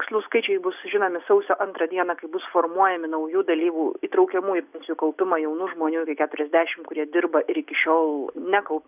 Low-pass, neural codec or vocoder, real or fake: 3.6 kHz; none; real